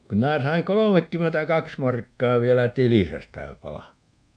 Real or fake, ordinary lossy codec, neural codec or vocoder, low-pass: fake; none; codec, 24 kHz, 1.2 kbps, DualCodec; 9.9 kHz